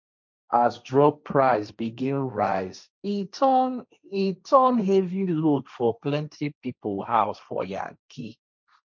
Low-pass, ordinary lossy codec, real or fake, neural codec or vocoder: 7.2 kHz; none; fake; codec, 16 kHz, 1.1 kbps, Voila-Tokenizer